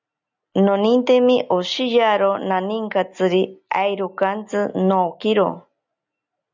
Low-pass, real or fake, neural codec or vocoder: 7.2 kHz; real; none